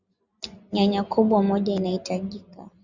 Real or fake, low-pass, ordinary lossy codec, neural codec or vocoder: real; 7.2 kHz; Opus, 64 kbps; none